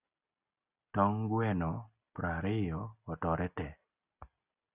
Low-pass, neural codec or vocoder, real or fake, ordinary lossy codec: 3.6 kHz; none; real; Opus, 64 kbps